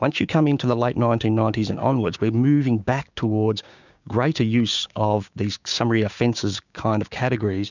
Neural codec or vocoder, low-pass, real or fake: codec, 16 kHz, 6 kbps, DAC; 7.2 kHz; fake